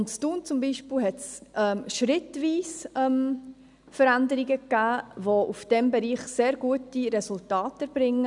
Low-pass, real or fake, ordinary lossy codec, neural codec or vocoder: 10.8 kHz; real; none; none